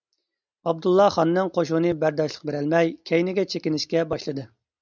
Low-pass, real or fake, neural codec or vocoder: 7.2 kHz; real; none